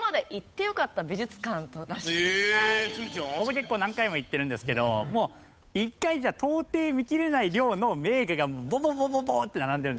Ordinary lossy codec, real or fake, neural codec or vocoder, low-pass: none; fake; codec, 16 kHz, 8 kbps, FunCodec, trained on Chinese and English, 25 frames a second; none